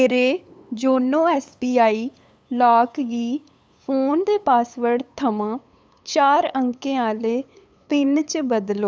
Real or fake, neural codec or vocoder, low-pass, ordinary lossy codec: fake; codec, 16 kHz, 8 kbps, FunCodec, trained on LibriTTS, 25 frames a second; none; none